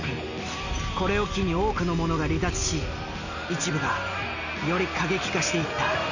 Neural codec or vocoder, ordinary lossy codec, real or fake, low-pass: none; none; real; 7.2 kHz